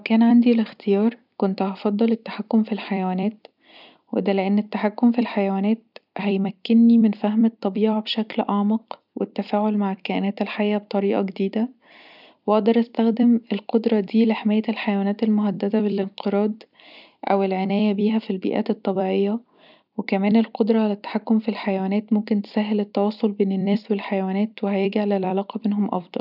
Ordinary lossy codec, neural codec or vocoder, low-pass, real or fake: none; vocoder, 44.1 kHz, 128 mel bands every 256 samples, BigVGAN v2; 5.4 kHz; fake